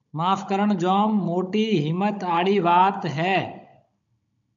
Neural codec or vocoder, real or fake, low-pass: codec, 16 kHz, 16 kbps, FunCodec, trained on Chinese and English, 50 frames a second; fake; 7.2 kHz